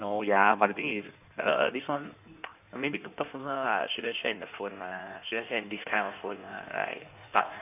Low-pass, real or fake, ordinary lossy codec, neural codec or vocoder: 3.6 kHz; fake; none; codec, 16 kHz in and 24 kHz out, 1.1 kbps, FireRedTTS-2 codec